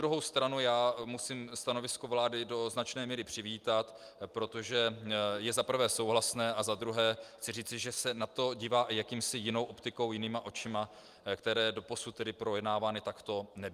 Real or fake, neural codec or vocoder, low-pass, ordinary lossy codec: real; none; 14.4 kHz; Opus, 32 kbps